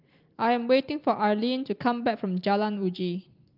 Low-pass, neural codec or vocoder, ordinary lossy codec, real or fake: 5.4 kHz; none; Opus, 32 kbps; real